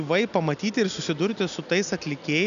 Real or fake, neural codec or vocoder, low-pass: real; none; 7.2 kHz